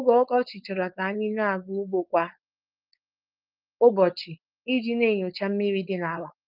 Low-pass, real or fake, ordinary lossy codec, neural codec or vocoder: 5.4 kHz; fake; Opus, 24 kbps; codec, 16 kHz, 4.8 kbps, FACodec